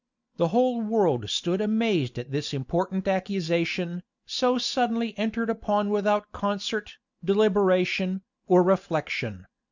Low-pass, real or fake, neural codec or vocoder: 7.2 kHz; real; none